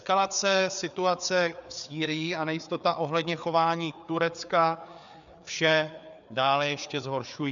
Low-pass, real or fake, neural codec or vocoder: 7.2 kHz; fake; codec, 16 kHz, 4 kbps, FreqCodec, larger model